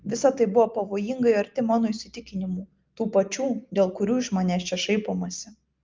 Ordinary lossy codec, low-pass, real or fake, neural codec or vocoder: Opus, 24 kbps; 7.2 kHz; real; none